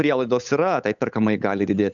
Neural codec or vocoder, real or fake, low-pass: codec, 16 kHz, 8 kbps, FunCodec, trained on Chinese and English, 25 frames a second; fake; 7.2 kHz